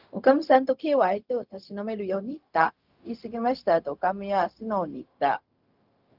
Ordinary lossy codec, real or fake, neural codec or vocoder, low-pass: Opus, 32 kbps; fake; codec, 16 kHz, 0.4 kbps, LongCat-Audio-Codec; 5.4 kHz